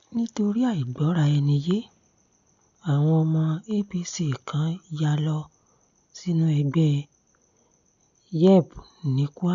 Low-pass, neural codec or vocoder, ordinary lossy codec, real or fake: 7.2 kHz; none; none; real